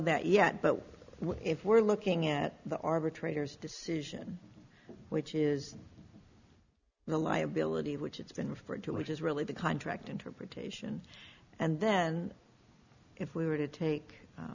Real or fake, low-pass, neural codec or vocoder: real; 7.2 kHz; none